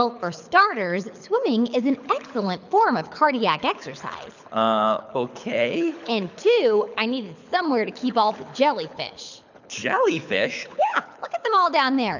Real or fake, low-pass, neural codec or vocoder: fake; 7.2 kHz; codec, 24 kHz, 6 kbps, HILCodec